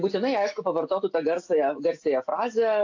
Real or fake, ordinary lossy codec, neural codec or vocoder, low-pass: real; AAC, 48 kbps; none; 7.2 kHz